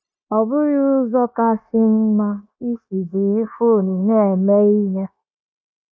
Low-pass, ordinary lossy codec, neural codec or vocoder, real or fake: none; none; codec, 16 kHz, 0.9 kbps, LongCat-Audio-Codec; fake